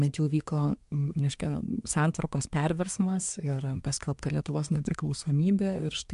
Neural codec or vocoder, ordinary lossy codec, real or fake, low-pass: codec, 24 kHz, 1 kbps, SNAC; MP3, 64 kbps; fake; 10.8 kHz